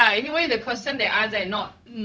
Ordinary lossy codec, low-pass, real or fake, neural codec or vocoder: none; none; fake; codec, 16 kHz, 0.4 kbps, LongCat-Audio-Codec